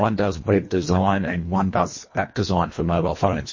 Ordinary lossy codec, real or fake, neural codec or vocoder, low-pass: MP3, 32 kbps; fake; codec, 24 kHz, 1.5 kbps, HILCodec; 7.2 kHz